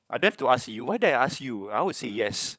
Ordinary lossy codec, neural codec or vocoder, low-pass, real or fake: none; codec, 16 kHz, 16 kbps, FunCodec, trained on LibriTTS, 50 frames a second; none; fake